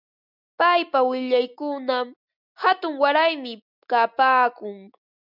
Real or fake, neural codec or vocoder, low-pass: real; none; 5.4 kHz